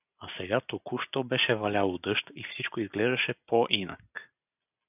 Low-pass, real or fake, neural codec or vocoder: 3.6 kHz; real; none